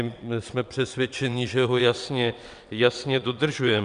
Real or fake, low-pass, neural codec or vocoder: fake; 9.9 kHz; vocoder, 22.05 kHz, 80 mel bands, WaveNeXt